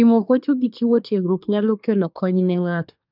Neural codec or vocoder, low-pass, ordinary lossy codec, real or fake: codec, 24 kHz, 1 kbps, SNAC; 5.4 kHz; none; fake